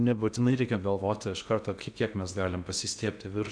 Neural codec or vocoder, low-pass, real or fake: codec, 16 kHz in and 24 kHz out, 0.6 kbps, FocalCodec, streaming, 2048 codes; 9.9 kHz; fake